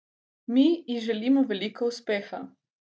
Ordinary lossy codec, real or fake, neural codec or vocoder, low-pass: none; real; none; none